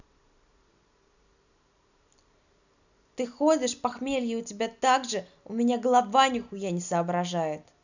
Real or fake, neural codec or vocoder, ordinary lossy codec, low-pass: real; none; none; 7.2 kHz